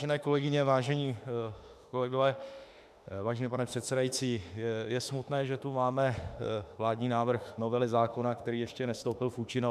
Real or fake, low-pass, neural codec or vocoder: fake; 14.4 kHz; autoencoder, 48 kHz, 32 numbers a frame, DAC-VAE, trained on Japanese speech